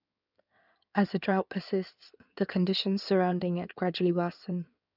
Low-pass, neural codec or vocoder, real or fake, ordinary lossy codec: 5.4 kHz; codec, 16 kHz in and 24 kHz out, 2.2 kbps, FireRedTTS-2 codec; fake; none